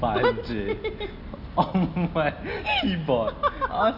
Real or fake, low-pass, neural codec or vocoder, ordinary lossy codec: real; 5.4 kHz; none; none